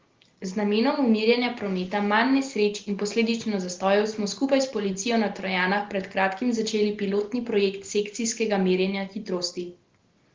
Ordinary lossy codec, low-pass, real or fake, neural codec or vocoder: Opus, 16 kbps; 7.2 kHz; real; none